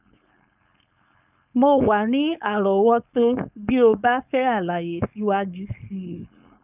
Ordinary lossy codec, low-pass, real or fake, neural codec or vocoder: none; 3.6 kHz; fake; codec, 16 kHz, 4.8 kbps, FACodec